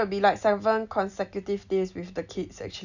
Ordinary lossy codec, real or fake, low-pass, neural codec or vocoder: none; real; 7.2 kHz; none